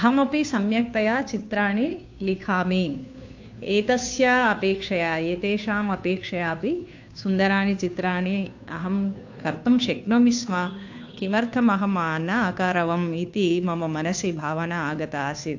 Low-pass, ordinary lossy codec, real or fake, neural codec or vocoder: 7.2 kHz; AAC, 48 kbps; fake; codec, 16 kHz, 2 kbps, FunCodec, trained on Chinese and English, 25 frames a second